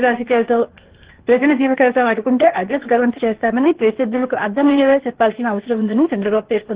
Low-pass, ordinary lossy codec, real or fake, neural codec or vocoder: 3.6 kHz; Opus, 16 kbps; fake; codec, 16 kHz, 0.8 kbps, ZipCodec